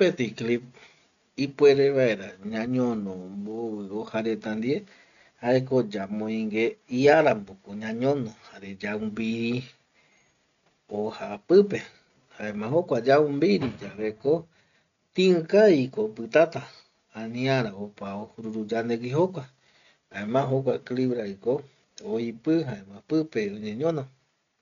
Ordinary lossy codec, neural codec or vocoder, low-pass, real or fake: none; none; 7.2 kHz; real